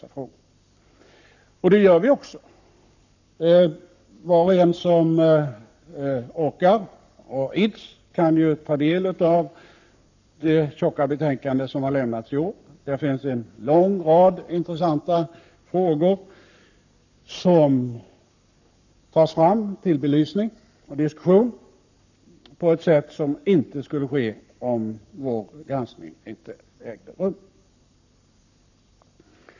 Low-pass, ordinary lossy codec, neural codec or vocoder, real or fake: 7.2 kHz; none; codec, 44.1 kHz, 7.8 kbps, Pupu-Codec; fake